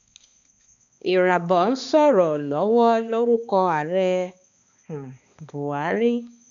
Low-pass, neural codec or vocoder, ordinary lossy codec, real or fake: 7.2 kHz; codec, 16 kHz, 2 kbps, X-Codec, HuBERT features, trained on balanced general audio; none; fake